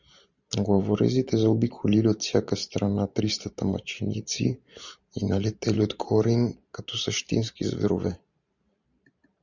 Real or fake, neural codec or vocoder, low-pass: fake; vocoder, 44.1 kHz, 128 mel bands every 512 samples, BigVGAN v2; 7.2 kHz